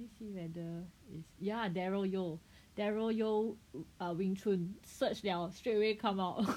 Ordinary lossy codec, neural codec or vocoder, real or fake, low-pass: none; none; real; 19.8 kHz